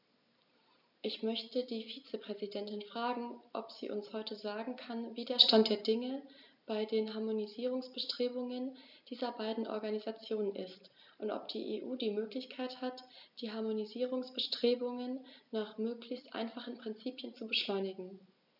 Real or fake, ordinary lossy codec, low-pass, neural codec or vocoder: real; none; 5.4 kHz; none